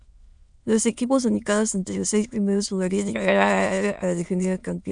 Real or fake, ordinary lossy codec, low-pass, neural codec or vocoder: fake; MP3, 96 kbps; 9.9 kHz; autoencoder, 22.05 kHz, a latent of 192 numbers a frame, VITS, trained on many speakers